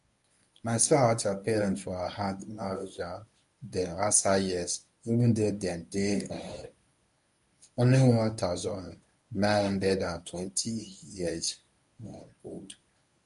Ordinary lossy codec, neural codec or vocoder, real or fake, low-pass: none; codec, 24 kHz, 0.9 kbps, WavTokenizer, medium speech release version 1; fake; 10.8 kHz